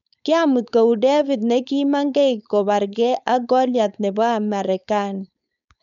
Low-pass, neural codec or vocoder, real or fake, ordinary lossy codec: 7.2 kHz; codec, 16 kHz, 4.8 kbps, FACodec; fake; none